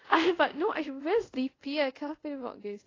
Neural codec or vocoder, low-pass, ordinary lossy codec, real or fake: codec, 24 kHz, 0.5 kbps, DualCodec; 7.2 kHz; AAC, 32 kbps; fake